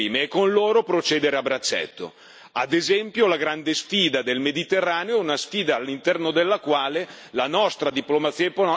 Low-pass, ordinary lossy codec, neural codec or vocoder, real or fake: none; none; none; real